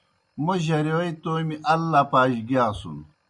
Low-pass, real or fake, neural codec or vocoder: 10.8 kHz; real; none